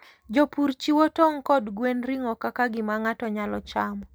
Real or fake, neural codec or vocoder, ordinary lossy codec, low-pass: real; none; none; none